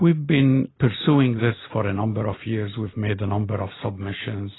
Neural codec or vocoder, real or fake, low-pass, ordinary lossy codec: none; real; 7.2 kHz; AAC, 16 kbps